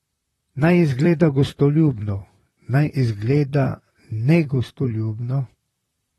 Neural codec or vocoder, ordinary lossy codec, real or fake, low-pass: vocoder, 44.1 kHz, 128 mel bands, Pupu-Vocoder; AAC, 32 kbps; fake; 19.8 kHz